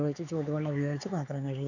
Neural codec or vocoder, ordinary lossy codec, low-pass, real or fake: codec, 16 kHz, 4 kbps, X-Codec, HuBERT features, trained on LibriSpeech; none; 7.2 kHz; fake